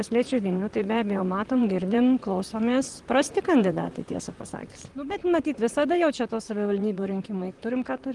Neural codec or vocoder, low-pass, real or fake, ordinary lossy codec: vocoder, 44.1 kHz, 128 mel bands, Pupu-Vocoder; 10.8 kHz; fake; Opus, 24 kbps